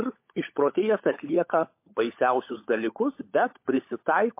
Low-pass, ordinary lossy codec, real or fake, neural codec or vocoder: 3.6 kHz; MP3, 24 kbps; fake; codec, 16 kHz, 16 kbps, FunCodec, trained on LibriTTS, 50 frames a second